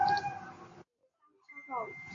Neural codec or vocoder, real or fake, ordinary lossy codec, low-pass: none; real; AAC, 32 kbps; 7.2 kHz